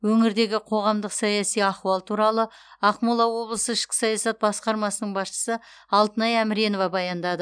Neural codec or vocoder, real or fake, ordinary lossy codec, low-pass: none; real; none; 9.9 kHz